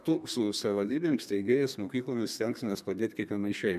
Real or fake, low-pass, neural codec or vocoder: fake; 14.4 kHz; codec, 32 kHz, 1.9 kbps, SNAC